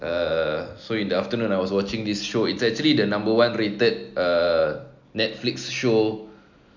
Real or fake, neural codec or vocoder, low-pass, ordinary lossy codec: real; none; 7.2 kHz; none